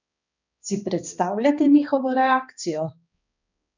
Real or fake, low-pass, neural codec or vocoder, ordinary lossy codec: fake; 7.2 kHz; codec, 16 kHz, 2 kbps, X-Codec, HuBERT features, trained on balanced general audio; none